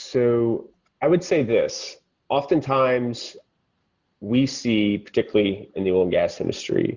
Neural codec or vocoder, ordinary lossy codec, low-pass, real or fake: none; Opus, 64 kbps; 7.2 kHz; real